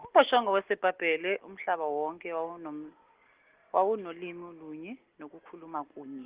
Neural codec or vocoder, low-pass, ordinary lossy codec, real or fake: none; 3.6 kHz; Opus, 16 kbps; real